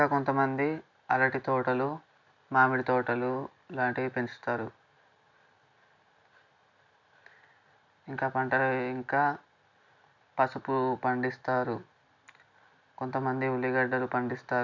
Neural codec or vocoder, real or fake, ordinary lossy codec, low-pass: none; real; none; 7.2 kHz